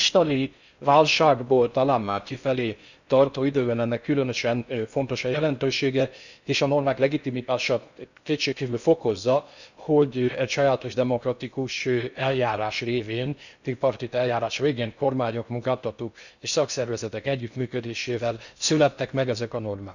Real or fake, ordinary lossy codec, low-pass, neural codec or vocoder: fake; none; 7.2 kHz; codec, 16 kHz in and 24 kHz out, 0.6 kbps, FocalCodec, streaming, 4096 codes